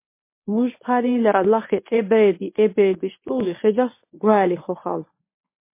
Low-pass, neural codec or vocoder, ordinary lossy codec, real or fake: 3.6 kHz; codec, 24 kHz, 0.9 kbps, WavTokenizer, medium speech release version 1; MP3, 24 kbps; fake